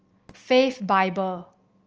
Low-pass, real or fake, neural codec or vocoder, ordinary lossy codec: 7.2 kHz; real; none; Opus, 24 kbps